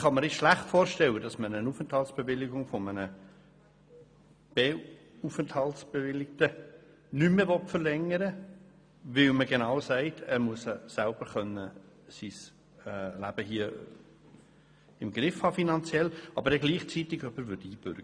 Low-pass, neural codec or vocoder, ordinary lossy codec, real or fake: 9.9 kHz; none; none; real